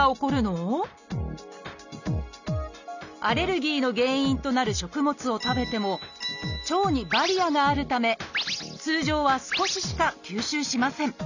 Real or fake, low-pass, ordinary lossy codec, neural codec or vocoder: real; 7.2 kHz; none; none